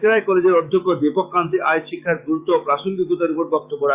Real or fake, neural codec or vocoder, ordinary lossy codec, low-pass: fake; autoencoder, 48 kHz, 128 numbers a frame, DAC-VAE, trained on Japanese speech; Opus, 64 kbps; 3.6 kHz